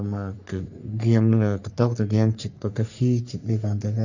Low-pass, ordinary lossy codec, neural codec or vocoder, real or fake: 7.2 kHz; none; codec, 44.1 kHz, 3.4 kbps, Pupu-Codec; fake